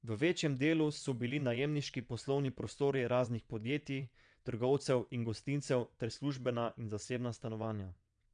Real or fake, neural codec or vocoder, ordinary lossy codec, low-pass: fake; vocoder, 22.05 kHz, 80 mel bands, WaveNeXt; none; 9.9 kHz